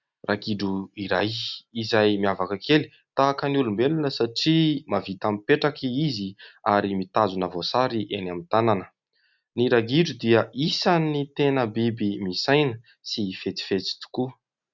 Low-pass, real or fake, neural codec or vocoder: 7.2 kHz; real; none